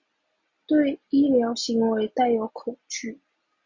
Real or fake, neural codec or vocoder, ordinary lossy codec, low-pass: real; none; Opus, 64 kbps; 7.2 kHz